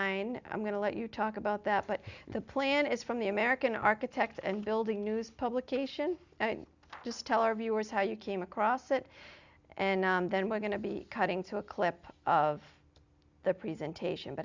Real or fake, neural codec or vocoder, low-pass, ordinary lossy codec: real; none; 7.2 kHz; Opus, 64 kbps